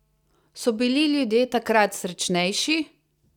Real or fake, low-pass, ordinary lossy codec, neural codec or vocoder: real; 19.8 kHz; none; none